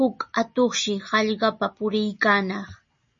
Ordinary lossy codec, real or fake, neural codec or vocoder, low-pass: MP3, 32 kbps; real; none; 7.2 kHz